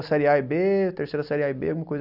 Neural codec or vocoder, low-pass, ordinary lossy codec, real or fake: none; 5.4 kHz; none; real